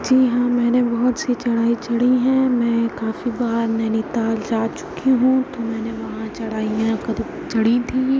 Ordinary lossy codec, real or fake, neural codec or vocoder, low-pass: none; real; none; none